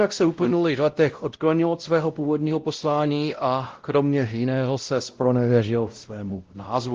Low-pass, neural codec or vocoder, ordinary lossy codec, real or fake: 7.2 kHz; codec, 16 kHz, 0.5 kbps, X-Codec, WavLM features, trained on Multilingual LibriSpeech; Opus, 16 kbps; fake